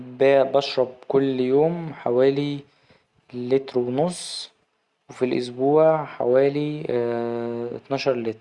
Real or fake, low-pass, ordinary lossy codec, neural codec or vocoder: real; 10.8 kHz; Opus, 64 kbps; none